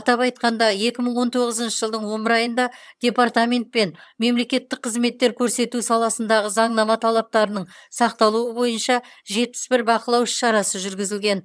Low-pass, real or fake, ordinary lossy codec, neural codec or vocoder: none; fake; none; vocoder, 22.05 kHz, 80 mel bands, HiFi-GAN